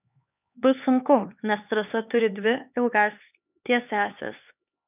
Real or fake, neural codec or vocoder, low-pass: fake; codec, 16 kHz, 4 kbps, X-Codec, HuBERT features, trained on LibriSpeech; 3.6 kHz